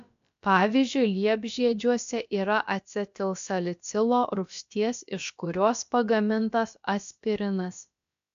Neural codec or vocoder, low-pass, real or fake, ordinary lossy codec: codec, 16 kHz, about 1 kbps, DyCAST, with the encoder's durations; 7.2 kHz; fake; AAC, 64 kbps